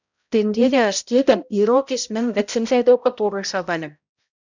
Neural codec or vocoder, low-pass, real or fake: codec, 16 kHz, 0.5 kbps, X-Codec, HuBERT features, trained on balanced general audio; 7.2 kHz; fake